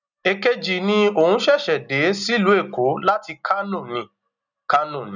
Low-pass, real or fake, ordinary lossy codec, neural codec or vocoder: 7.2 kHz; real; none; none